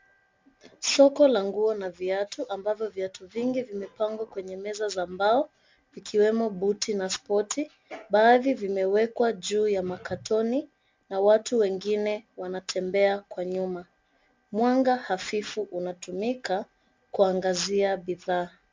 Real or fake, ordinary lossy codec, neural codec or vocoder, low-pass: real; MP3, 64 kbps; none; 7.2 kHz